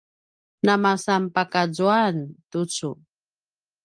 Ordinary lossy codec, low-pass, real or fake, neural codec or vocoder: Opus, 32 kbps; 9.9 kHz; real; none